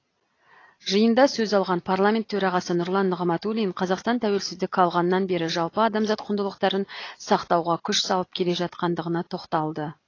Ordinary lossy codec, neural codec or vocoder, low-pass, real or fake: AAC, 32 kbps; none; 7.2 kHz; real